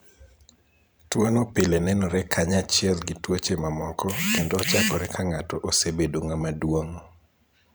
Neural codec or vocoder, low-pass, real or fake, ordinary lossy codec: vocoder, 44.1 kHz, 128 mel bands every 512 samples, BigVGAN v2; none; fake; none